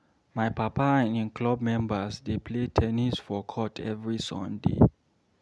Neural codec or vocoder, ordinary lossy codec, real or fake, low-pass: none; none; real; none